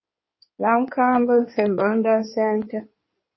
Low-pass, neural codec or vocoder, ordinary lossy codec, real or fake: 7.2 kHz; codec, 16 kHz in and 24 kHz out, 2.2 kbps, FireRedTTS-2 codec; MP3, 24 kbps; fake